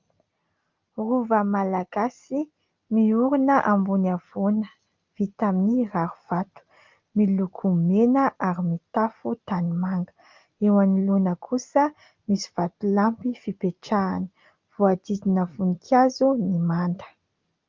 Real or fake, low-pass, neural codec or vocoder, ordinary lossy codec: real; 7.2 kHz; none; Opus, 24 kbps